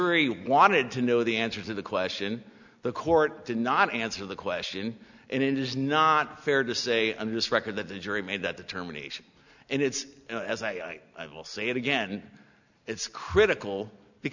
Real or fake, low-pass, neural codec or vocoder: real; 7.2 kHz; none